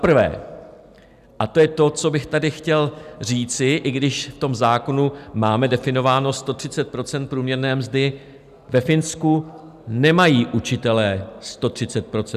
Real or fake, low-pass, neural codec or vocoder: real; 14.4 kHz; none